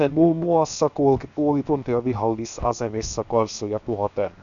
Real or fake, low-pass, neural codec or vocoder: fake; 7.2 kHz; codec, 16 kHz, 0.7 kbps, FocalCodec